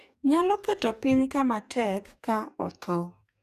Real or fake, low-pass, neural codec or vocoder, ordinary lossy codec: fake; 14.4 kHz; codec, 44.1 kHz, 2.6 kbps, DAC; none